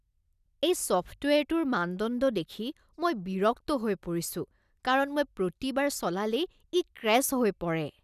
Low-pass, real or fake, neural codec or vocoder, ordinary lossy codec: 14.4 kHz; real; none; none